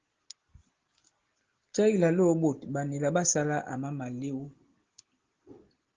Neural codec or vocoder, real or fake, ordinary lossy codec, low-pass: none; real; Opus, 16 kbps; 7.2 kHz